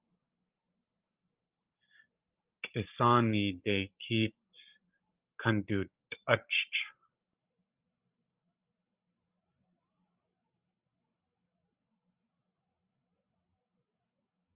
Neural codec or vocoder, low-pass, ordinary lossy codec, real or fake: codec, 16 kHz, 16 kbps, FreqCodec, larger model; 3.6 kHz; Opus, 32 kbps; fake